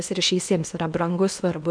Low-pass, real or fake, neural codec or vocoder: 9.9 kHz; fake; codec, 16 kHz in and 24 kHz out, 0.8 kbps, FocalCodec, streaming, 65536 codes